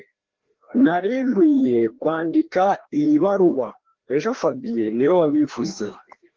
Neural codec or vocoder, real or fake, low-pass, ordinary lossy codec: codec, 16 kHz, 1 kbps, FreqCodec, larger model; fake; 7.2 kHz; Opus, 16 kbps